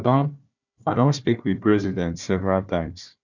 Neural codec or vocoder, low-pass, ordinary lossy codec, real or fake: codec, 16 kHz, 1 kbps, FunCodec, trained on Chinese and English, 50 frames a second; 7.2 kHz; none; fake